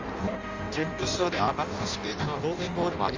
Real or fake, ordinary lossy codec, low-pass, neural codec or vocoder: fake; Opus, 32 kbps; 7.2 kHz; codec, 16 kHz in and 24 kHz out, 0.6 kbps, FireRedTTS-2 codec